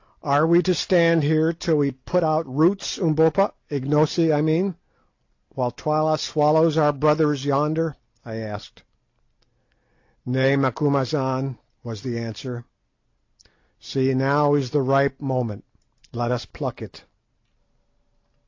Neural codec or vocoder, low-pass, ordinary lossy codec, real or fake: none; 7.2 kHz; AAC, 48 kbps; real